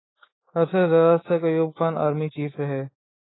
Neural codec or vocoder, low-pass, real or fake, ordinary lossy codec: codec, 24 kHz, 3.1 kbps, DualCodec; 7.2 kHz; fake; AAC, 16 kbps